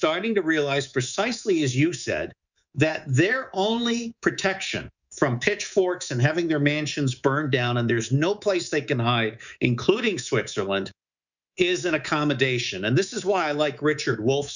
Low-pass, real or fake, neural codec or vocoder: 7.2 kHz; fake; codec, 24 kHz, 3.1 kbps, DualCodec